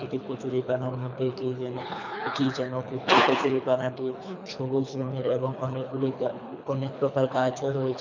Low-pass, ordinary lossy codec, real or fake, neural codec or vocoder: 7.2 kHz; none; fake; codec, 24 kHz, 3 kbps, HILCodec